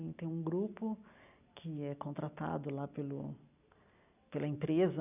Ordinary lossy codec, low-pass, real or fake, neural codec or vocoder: none; 3.6 kHz; real; none